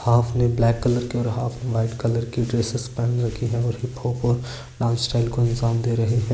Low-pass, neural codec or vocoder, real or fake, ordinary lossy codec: none; none; real; none